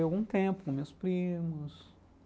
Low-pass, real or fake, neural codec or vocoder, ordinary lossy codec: none; real; none; none